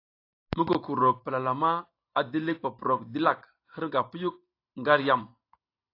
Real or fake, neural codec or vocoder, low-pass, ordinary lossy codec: real; none; 5.4 kHz; AAC, 32 kbps